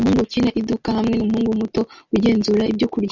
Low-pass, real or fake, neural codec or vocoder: 7.2 kHz; real; none